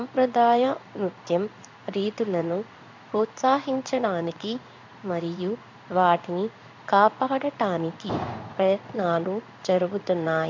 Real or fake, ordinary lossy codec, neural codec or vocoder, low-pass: fake; MP3, 64 kbps; codec, 16 kHz in and 24 kHz out, 1 kbps, XY-Tokenizer; 7.2 kHz